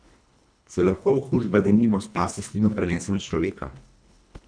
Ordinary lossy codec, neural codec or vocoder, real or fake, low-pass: MP3, 96 kbps; codec, 24 kHz, 1.5 kbps, HILCodec; fake; 9.9 kHz